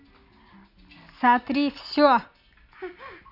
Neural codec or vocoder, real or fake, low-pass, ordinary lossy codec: none; real; 5.4 kHz; none